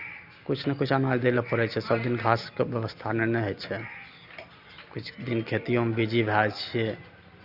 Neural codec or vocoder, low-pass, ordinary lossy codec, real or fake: none; 5.4 kHz; Opus, 64 kbps; real